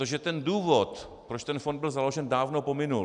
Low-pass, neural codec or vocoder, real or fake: 10.8 kHz; none; real